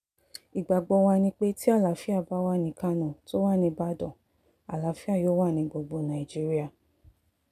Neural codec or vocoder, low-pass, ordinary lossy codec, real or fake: none; 14.4 kHz; none; real